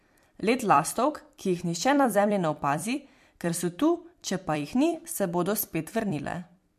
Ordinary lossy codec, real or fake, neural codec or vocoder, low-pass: MP3, 64 kbps; fake; vocoder, 44.1 kHz, 128 mel bands every 256 samples, BigVGAN v2; 14.4 kHz